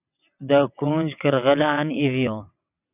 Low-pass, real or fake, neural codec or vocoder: 3.6 kHz; fake; vocoder, 22.05 kHz, 80 mel bands, WaveNeXt